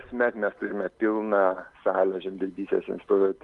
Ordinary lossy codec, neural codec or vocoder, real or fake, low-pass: Opus, 24 kbps; none; real; 10.8 kHz